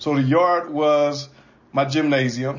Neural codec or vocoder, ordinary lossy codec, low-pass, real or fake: none; MP3, 32 kbps; 7.2 kHz; real